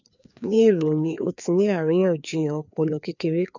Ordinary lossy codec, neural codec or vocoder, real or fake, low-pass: none; codec, 16 kHz, 2 kbps, FreqCodec, larger model; fake; 7.2 kHz